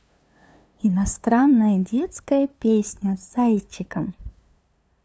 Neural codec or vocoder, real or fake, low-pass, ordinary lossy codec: codec, 16 kHz, 2 kbps, FunCodec, trained on LibriTTS, 25 frames a second; fake; none; none